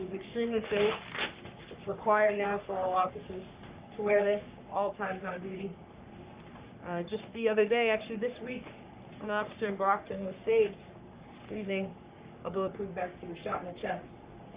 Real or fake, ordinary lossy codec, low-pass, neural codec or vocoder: fake; Opus, 64 kbps; 3.6 kHz; codec, 44.1 kHz, 3.4 kbps, Pupu-Codec